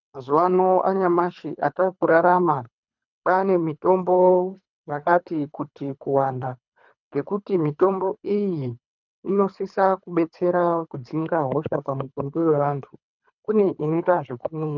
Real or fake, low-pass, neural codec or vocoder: fake; 7.2 kHz; codec, 24 kHz, 3 kbps, HILCodec